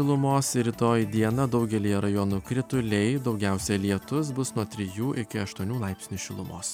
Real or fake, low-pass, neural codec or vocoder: real; 19.8 kHz; none